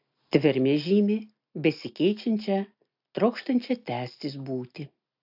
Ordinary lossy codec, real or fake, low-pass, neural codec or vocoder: AAC, 48 kbps; real; 5.4 kHz; none